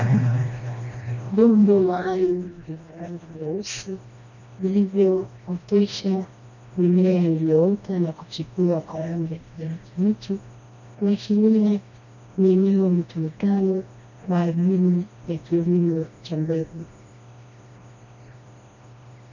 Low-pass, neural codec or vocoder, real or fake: 7.2 kHz; codec, 16 kHz, 1 kbps, FreqCodec, smaller model; fake